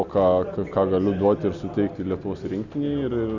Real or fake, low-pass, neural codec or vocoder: real; 7.2 kHz; none